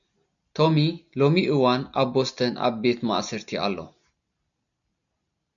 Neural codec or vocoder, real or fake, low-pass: none; real; 7.2 kHz